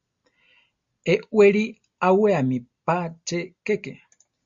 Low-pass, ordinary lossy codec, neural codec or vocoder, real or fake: 7.2 kHz; Opus, 64 kbps; none; real